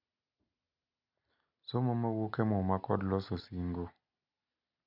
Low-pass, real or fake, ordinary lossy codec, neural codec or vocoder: 5.4 kHz; real; none; none